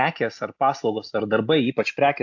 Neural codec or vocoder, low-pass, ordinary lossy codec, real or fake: none; 7.2 kHz; AAC, 48 kbps; real